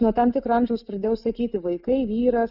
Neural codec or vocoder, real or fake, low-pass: none; real; 5.4 kHz